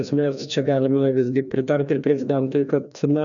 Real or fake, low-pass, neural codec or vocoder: fake; 7.2 kHz; codec, 16 kHz, 1 kbps, FreqCodec, larger model